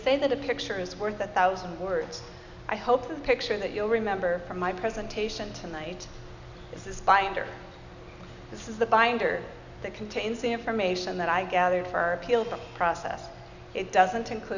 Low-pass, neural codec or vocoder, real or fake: 7.2 kHz; none; real